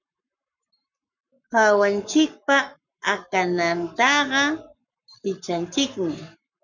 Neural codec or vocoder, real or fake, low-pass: codec, 44.1 kHz, 7.8 kbps, Pupu-Codec; fake; 7.2 kHz